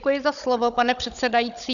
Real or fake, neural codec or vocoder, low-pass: fake; codec, 16 kHz, 16 kbps, FunCodec, trained on Chinese and English, 50 frames a second; 7.2 kHz